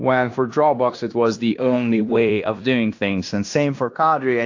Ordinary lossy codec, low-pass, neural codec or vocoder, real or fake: AAC, 48 kbps; 7.2 kHz; codec, 16 kHz in and 24 kHz out, 0.9 kbps, LongCat-Audio-Codec, fine tuned four codebook decoder; fake